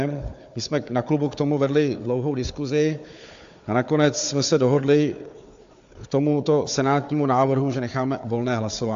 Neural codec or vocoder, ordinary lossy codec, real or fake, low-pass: codec, 16 kHz, 4 kbps, FunCodec, trained on Chinese and English, 50 frames a second; AAC, 64 kbps; fake; 7.2 kHz